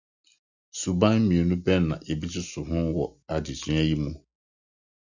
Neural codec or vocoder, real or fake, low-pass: none; real; 7.2 kHz